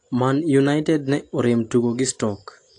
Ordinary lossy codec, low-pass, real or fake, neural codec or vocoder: AAC, 48 kbps; 9.9 kHz; real; none